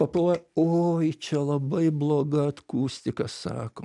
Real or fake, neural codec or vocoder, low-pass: real; none; 10.8 kHz